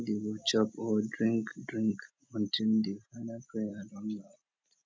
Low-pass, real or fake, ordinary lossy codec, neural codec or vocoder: none; real; none; none